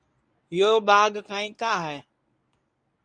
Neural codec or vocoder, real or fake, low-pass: codec, 24 kHz, 0.9 kbps, WavTokenizer, medium speech release version 1; fake; 9.9 kHz